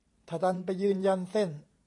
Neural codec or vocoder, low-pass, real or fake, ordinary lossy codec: vocoder, 44.1 kHz, 128 mel bands every 512 samples, BigVGAN v2; 10.8 kHz; fake; AAC, 48 kbps